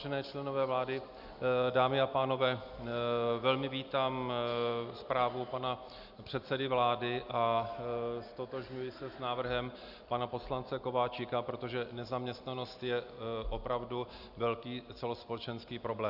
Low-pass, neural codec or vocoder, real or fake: 5.4 kHz; none; real